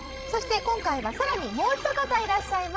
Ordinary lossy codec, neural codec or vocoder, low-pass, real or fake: none; codec, 16 kHz, 16 kbps, FreqCodec, larger model; none; fake